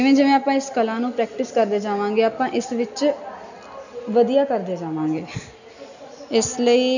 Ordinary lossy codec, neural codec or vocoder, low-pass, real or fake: none; none; 7.2 kHz; real